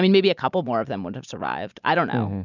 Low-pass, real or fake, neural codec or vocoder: 7.2 kHz; real; none